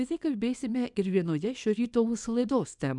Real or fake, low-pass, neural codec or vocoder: fake; 10.8 kHz; codec, 24 kHz, 0.9 kbps, WavTokenizer, small release